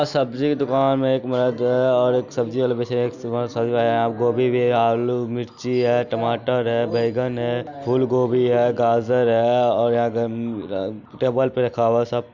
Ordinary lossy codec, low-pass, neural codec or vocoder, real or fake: none; 7.2 kHz; none; real